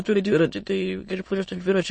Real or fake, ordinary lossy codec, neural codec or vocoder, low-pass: fake; MP3, 32 kbps; autoencoder, 22.05 kHz, a latent of 192 numbers a frame, VITS, trained on many speakers; 9.9 kHz